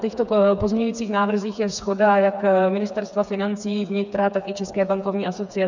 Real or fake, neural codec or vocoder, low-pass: fake; codec, 16 kHz, 4 kbps, FreqCodec, smaller model; 7.2 kHz